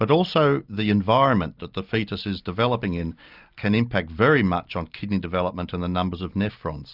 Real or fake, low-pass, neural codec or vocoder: real; 5.4 kHz; none